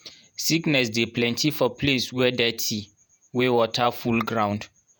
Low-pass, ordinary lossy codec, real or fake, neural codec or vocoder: none; none; fake; vocoder, 48 kHz, 128 mel bands, Vocos